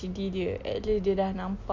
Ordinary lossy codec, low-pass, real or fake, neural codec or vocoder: none; 7.2 kHz; real; none